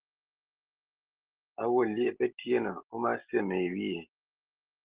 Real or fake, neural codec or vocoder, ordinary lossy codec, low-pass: real; none; Opus, 16 kbps; 3.6 kHz